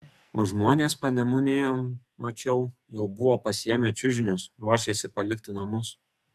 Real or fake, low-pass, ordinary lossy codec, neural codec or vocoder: fake; 14.4 kHz; AAC, 96 kbps; codec, 44.1 kHz, 2.6 kbps, SNAC